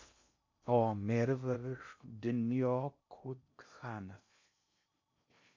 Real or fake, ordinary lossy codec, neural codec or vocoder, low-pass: fake; MP3, 64 kbps; codec, 16 kHz in and 24 kHz out, 0.6 kbps, FocalCodec, streaming, 2048 codes; 7.2 kHz